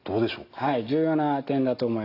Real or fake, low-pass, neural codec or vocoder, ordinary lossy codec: real; 5.4 kHz; none; AAC, 32 kbps